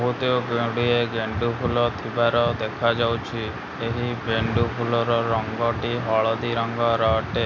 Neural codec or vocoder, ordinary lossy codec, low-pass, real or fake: none; none; none; real